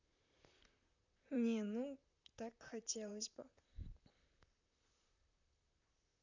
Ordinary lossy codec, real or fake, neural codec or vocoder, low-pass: none; fake; vocoder, 44.1 kHz, 128 mel bands, Pupu-Vocoder; 7.2 kHz